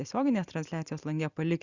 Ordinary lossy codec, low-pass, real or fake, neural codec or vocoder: Opus, 64 kbps; 7.2 kHz; real; none